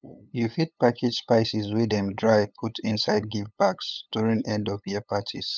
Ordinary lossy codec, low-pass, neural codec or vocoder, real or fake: none; none; codec, 16 kHz, 8 kbps, FunCodec, trained on LibriTTS, 25 frames a second; fake